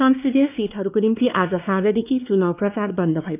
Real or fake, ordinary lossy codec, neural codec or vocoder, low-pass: fake; none; codec, 16 kHz, 2 kbps, X-Codec, WavLM features, trained on Multilingual LibriSpeech; 3.6 kHz